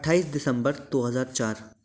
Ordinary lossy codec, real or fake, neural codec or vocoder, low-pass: none; real; none; none